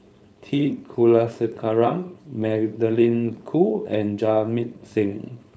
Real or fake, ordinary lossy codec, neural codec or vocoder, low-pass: fake; none; codec, 16 kHz, 4.8 kbps, FACodec; none